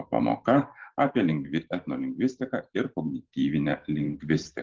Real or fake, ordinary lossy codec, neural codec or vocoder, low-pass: fake; Opus, 16 kbps; vocoder, 44.1 kHz, 128 mel bands every 512 samples, BigVGAN v2; 7.2 kHz